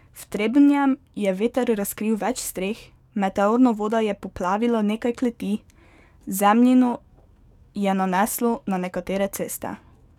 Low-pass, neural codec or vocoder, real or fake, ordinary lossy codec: 19.8 kHz; codec, 44.1 kHz, 7.8 kbps, DAC; fake; none